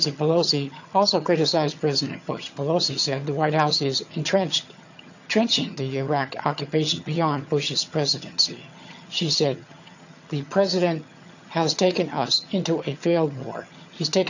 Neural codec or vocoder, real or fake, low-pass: vocoder, 22.05 kHz, 80 mel bands, HiFi-GAN; fake; 7.2 kHz